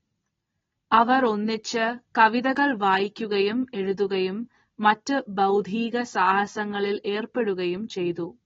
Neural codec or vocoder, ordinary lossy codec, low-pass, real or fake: none; AAC, 24 kbps; 7.2 kHz; real